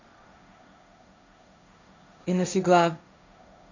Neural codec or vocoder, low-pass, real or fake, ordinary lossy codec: codec, 16 kHz, 1.1 kbps, Voila-Tokenizer; 7.2 kHz; fake; none